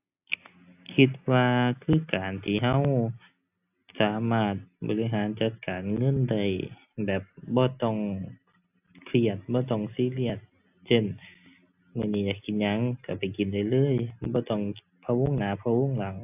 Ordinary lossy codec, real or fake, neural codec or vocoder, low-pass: AAC, 32 kbps; real; none; 3.6 kHz